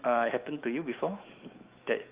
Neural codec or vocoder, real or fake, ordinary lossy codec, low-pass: autoencoder, 48 kHz, 128 numbers a frame, DAC-VAE, trained on Japanese speech; fake; Opus, 32 kbps; 3.6 kHz